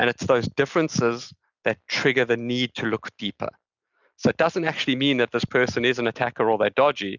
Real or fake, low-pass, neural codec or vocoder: real; 7.2 kHz; none